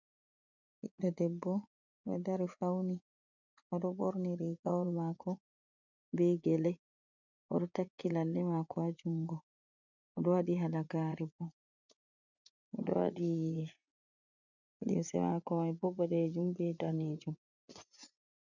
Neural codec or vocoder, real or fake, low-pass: vocoder, 24 kHz, 100 mel bands, Vocos; fake; 7.2 kHz